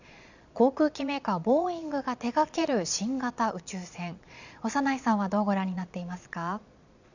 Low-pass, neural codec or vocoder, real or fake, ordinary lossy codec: 7.2 kHz; vocoder, 22.05 kHz, 80 mel bands, Vocos; fake; none